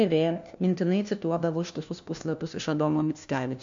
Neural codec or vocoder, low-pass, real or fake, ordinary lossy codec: codec, 16 kHz, 1 kbps, FunCodec, trained on LibriTTS, 50 frames a second; 7.2 kHz; fake; MP3, 64 kbps